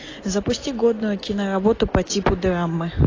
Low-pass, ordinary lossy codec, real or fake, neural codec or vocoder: 7.2 kHz; AAC, 32 kbps; real; none